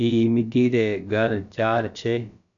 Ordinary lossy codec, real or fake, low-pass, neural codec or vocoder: MP3, 96 kbps; fake; 7.2 kHz; codec, 16 kHz, about 1 kbps, DyCAST, with the encoder's durations